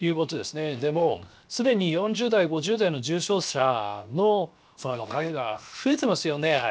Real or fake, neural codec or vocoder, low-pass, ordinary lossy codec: fake; codec, 16 kHz, 0.7 kbps, FocalCodec; none; none